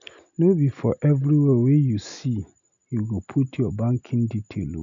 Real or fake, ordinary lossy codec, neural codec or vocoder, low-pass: real; none; none; 7.2 kHz